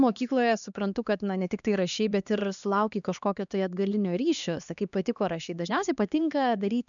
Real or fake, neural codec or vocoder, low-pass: fake; codec, 16 kHz, 2 kbps, X-Codec, HuBERT features, trained on LibriSpeech; 7.2 kHz